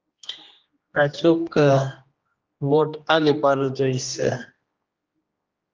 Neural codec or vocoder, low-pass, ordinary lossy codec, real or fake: codec, 16 kHz, 1 kbps, X-Codec, HuBERT features, trained on general audio; 7.2 kHz; Opus, 32 kbps; fake